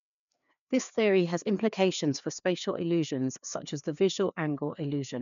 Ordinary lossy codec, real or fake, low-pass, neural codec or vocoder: none; fake; 7.2 kHz; codec, 16 kHz, 2 kbps, FreqCodec, larger model